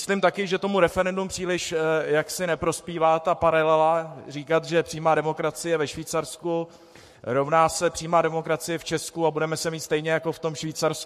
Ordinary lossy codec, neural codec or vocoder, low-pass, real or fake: MP3, 64 kbps; codec, 44.1 kHz, 7.8 kbps, Pupu-Codec; 14.4 kHz; fake